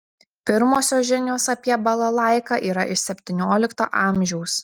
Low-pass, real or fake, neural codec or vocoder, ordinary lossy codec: 19.8 kHz; real; none; Opus, 32 kbps